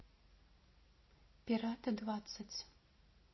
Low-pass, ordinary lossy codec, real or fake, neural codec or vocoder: 7.2 kHz; MP3, 24 kbps; real; none